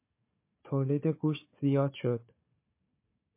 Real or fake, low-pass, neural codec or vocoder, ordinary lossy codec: fake; 3.6 kHz; codec, 16 kHz, 4 kbps, FunCodec, trained on Chinese and English, 50 frames a second; MP3, 24 kbps